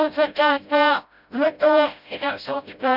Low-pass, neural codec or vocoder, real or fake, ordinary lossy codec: 5.4 kHz; codec, 16 kHz, 0.5 kbps, FreqCodec, smaller model; fake; none